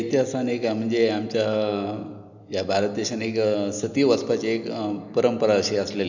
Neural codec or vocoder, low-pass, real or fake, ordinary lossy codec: none; 7.2 kHz; real; none